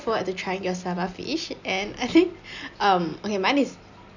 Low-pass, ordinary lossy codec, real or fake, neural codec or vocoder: 7.2 kHz; none; real; none